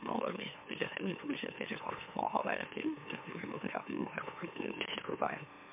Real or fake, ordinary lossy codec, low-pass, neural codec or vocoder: fake; MP3, 32 kbps; 3.6 kHz; autoencoder, 44.1 kHz, a latent of 192 numbers a frame, MeloTTS